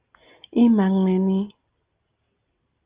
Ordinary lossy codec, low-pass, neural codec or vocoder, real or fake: Opus, 32 kbps; 3.6 kHz; none; real